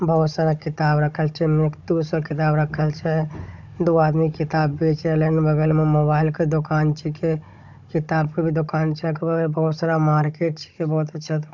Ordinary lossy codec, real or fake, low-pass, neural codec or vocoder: none; fake; 7.2 kHz; codec, 16 kHz, 16 kbps, FunCodec, trained on Chinese and English, 50 frames a second